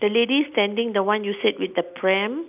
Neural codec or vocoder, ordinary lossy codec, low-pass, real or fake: none; none; 3.6 kHz; real